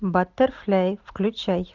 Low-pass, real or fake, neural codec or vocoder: 7.2 kHz; real; none